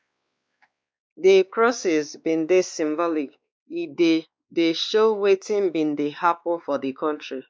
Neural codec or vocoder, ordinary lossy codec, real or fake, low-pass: codec, 16 kHz, 2 kbps, X-Codec, WavLM features, trained on Multilingual LibriSpeech; none; fake; none